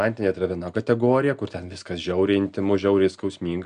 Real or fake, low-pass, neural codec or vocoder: real; 9.9 kHz; none